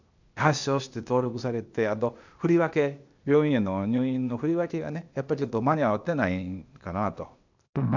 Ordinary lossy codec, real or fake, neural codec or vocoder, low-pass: none; fake; codec, 16 kHz, 0.8 kbps, ZipCodec; 7.2 kHz